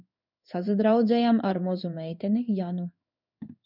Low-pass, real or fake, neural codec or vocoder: 5.4 kHz; fake; codec, 16 kHz in and 24 kHz out, 1 kbps, XY-Tokenizer